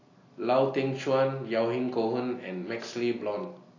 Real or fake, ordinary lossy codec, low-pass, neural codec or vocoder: real; AAC, 32 kbps; 7.2 kHz; none